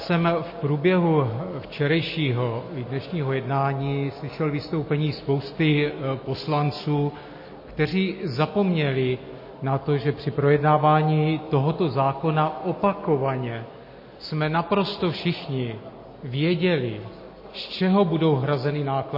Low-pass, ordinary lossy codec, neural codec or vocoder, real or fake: 5.4 kHz; MP3, 24 kbps; none; real